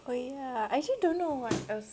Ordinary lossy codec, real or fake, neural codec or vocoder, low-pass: none; real; none; none